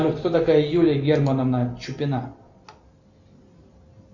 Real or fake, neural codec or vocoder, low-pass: real; none; 7.2 kHz